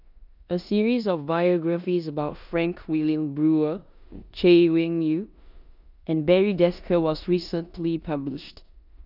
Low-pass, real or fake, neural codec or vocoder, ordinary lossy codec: 5.4 kHz; fake; codec, 16 kHz in and 24 kHz out, 0.9 kbps, LongCat-Audio-Codec, four codebook decoder; none